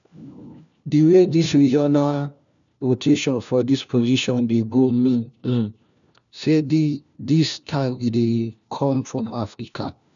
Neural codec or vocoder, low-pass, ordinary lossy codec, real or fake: codec, 16 kHz, 1 kbps, FunCodec, trained on LibriTTS, 50 frames a second; 7.2 kHz; none; fake